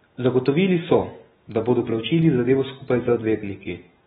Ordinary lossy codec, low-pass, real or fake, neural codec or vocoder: AAC, 16 kbps; 19.8 kHz; real; none